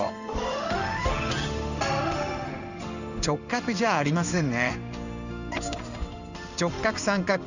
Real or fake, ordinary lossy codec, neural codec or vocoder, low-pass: fake; none; codec, 16 kHz in and 24 kHz out, 1 kbps, XY-Tokenizer; 7.2 kHz